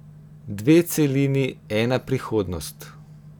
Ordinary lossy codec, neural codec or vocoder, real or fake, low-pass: none; none; real; 19.8 kHz